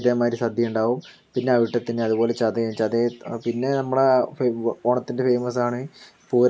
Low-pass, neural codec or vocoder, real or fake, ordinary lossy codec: none; none; real; none